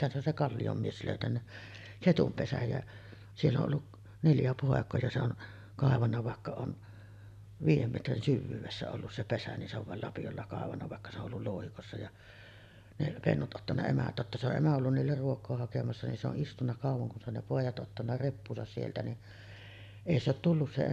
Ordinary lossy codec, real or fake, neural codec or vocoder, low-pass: none; real; none; 14.4 kHz